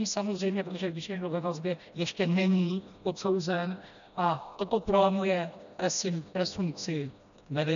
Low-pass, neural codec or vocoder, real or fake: 7.2 kHz; codec, 16 kHz, 1 kbps, FreqCodec, smaller model; fake